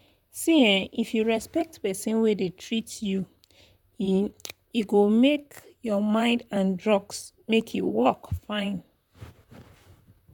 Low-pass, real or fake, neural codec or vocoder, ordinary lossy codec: 19.8 kHz; fake; vocoder, 44.1 kHz, 128 mel bands, Pupu-Vocoder; none